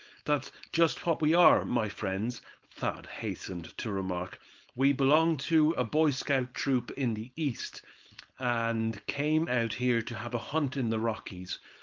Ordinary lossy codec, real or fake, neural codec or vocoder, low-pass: Opus, 32 kbps; fake; codec, 16 kHz, 4.8 kbps, FACodec; 7.2 kHz